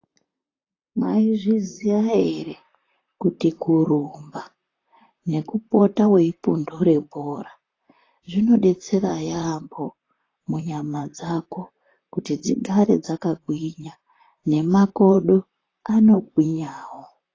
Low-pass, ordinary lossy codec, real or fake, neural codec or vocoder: 7.2 kHz; AAC, 32 kbps; fake; vocoder, 44.1 kHz, 128 mel bands, Pupu-Vocoder